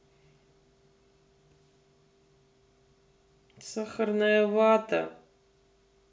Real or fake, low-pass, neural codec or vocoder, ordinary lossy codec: real; none; none; none